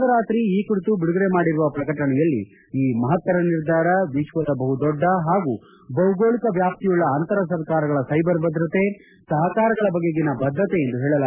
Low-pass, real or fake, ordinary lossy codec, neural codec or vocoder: 3.6 kHz; real; none; none